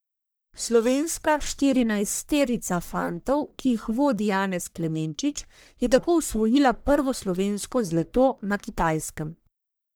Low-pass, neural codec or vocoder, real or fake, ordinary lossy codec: none; codec, 44.1 kHz, 1.7 kbps, Pupu-Codec; fake; none